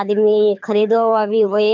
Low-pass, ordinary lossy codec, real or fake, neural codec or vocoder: 7.2 kHz; MP3, 64 kbps; fake; codec, 24 kHz, 6 kbps, HILCodec